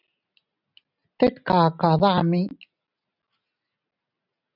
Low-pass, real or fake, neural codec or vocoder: 5.4 kHz; real; none